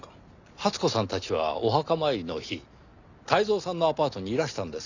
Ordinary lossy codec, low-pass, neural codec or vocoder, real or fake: none; 7.2 kHz; none; real